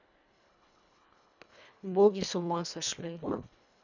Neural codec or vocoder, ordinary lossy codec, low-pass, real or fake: codec, 24 kHz, 1.5 kbps, HILCodec; none; 7.2 kHz; fake